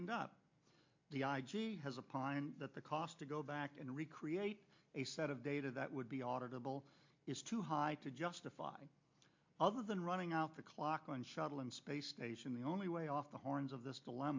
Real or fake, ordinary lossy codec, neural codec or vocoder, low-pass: real; MP3, 48 kbps; none; 7.2 kHz